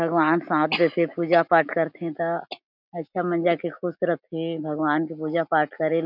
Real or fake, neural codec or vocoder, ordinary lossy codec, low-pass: real; none; none; 5.4 kHz